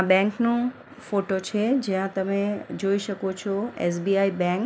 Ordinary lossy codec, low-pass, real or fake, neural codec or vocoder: none; none; real; none